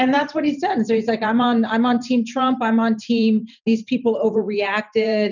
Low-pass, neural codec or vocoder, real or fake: 7.2 kHz; vocoder, 44.1 kHz, 128 mel bands every 512 samples, BigVGAN v2; fake